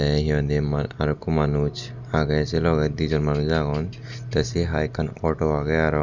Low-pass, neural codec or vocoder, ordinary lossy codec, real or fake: 7.2 kHz; none; none; real